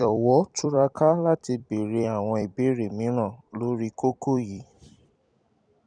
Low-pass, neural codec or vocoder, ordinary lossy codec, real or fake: 9.9 kHz; vocoder, 44.1 kHz, 128 mel bands every 256 samples, BigVGAN v2; none; fake